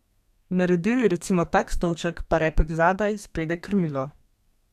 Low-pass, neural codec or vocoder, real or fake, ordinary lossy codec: 14.4 kHz; codec, 32 kHz, 1.9 kbps, SNAC; fake; none